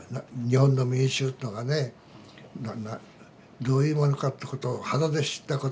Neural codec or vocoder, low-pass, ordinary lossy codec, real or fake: none; none; none; real